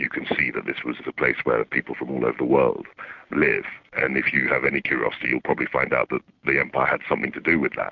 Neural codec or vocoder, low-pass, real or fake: none; 7.2 kHz; real